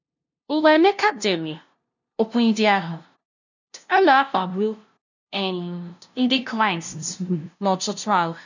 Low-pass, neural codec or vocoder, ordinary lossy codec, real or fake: 7.2 kHz; codec, 16 kHz, 0.5 kbps, FunCodec, trained on LibriTTS, 25 frames a second; none; fake